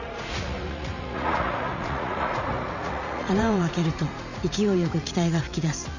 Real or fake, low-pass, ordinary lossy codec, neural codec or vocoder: fake; 7.2 kHz; none; vocoder, 44.1 kHz, 80 mel bands, Vocos